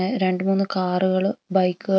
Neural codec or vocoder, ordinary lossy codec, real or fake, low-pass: none; none; real; none